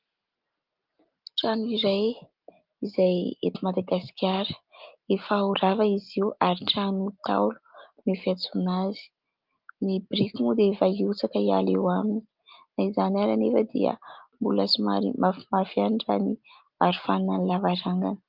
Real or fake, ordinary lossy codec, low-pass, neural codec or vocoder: real; Opus, 32 kbps; 5.4 kHz; none